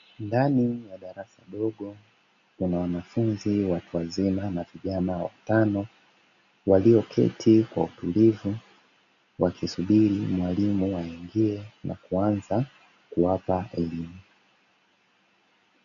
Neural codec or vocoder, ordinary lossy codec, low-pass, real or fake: none; MP3, 96 kbps; 7.2 kHz; real